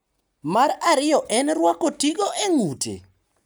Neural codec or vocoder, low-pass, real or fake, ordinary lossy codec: none; none; real; none